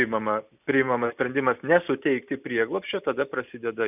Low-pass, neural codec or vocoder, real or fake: 3.6 kHz; none; real